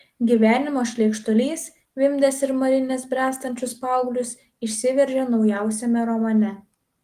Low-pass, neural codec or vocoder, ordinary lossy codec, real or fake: 14.4 kHz; none; Opus, 24 kbps; real